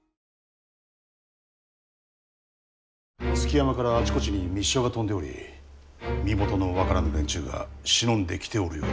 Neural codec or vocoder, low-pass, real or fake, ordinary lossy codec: none; none; real; none